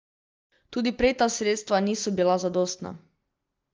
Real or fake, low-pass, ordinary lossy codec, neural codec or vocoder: real; 7.2 kHz; Opus, 24 kbps; none